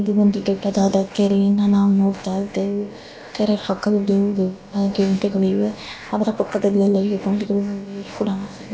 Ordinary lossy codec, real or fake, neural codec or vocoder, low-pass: none; fake; codec, 16 kHz, about 1 kbps, DyCAST, with the encoder's durations; none